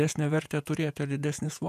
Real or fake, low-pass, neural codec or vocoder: fake; 14.4 kHz; vocoder, 48 kHz, 128 mel bands, Vocos